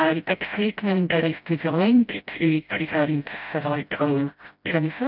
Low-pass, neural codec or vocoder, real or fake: 5.4 kHz; codec, 16 kHz, 0.5 kbps, FreqCodec, smaller model; fake